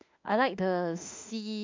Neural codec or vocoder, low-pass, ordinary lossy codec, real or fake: autoencoder, 48 kHz, 32 numbers a frame, DAC-VAE, trained on Japanese speech; 7.2 kHz; MP3, 64 kbps; fake